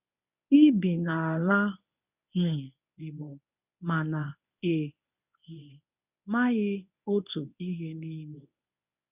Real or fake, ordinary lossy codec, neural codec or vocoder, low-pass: fake; none; codec, 24 kHz, 0.9 kbps, WavTokenizer, medium speech release version 1; 3.6 kHz